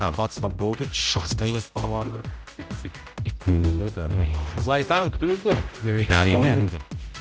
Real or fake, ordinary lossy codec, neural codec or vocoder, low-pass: fake; none; codec, 16 kHz, 0.5 kbps, X-Codec, HuBERT features, trained on general audio; none